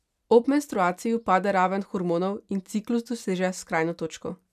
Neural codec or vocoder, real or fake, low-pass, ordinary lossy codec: none; real; 14.4 kHz; none